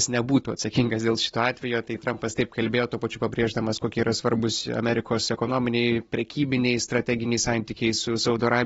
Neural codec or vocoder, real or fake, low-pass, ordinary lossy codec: none; real; 19.8 kHz; AAC, 24 kbps